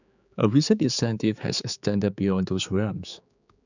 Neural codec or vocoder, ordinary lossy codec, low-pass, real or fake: codec, 16 kHz, 4 kbps, X-Codec, HuBERT features, trained on general audio; none; 7.2 kHz; fake